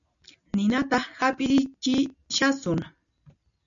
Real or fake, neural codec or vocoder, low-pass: real; none; 7.2 kHz